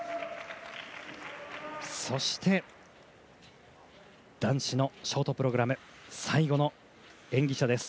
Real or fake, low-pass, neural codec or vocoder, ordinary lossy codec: real; none; none; none